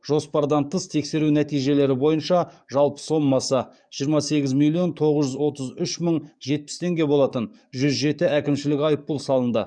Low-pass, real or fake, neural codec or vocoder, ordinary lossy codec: 9.9 kHz; fake; codec, 44.1 kHz, 7.8 kbps, DAC; none